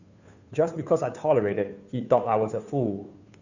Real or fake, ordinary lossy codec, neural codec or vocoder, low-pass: fake; none; codec, 16 kHz, 2 kbps, FunCodec, trained on Chinese and English, 25 frames a second; 7.2 kHz